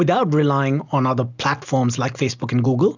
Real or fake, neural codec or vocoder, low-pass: real; none; 7.2 kHz